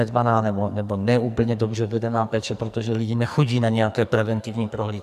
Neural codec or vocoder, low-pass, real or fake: codec, 44.1 kHz, 2.6 kbps, SNAC; 14.4 kHz; fake